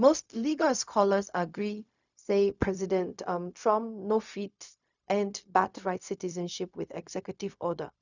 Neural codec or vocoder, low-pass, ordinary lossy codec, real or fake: codec, 16 kHz, 0.4 kbps, LongCat-Audio-Codec; 7.2 kHz; none; fake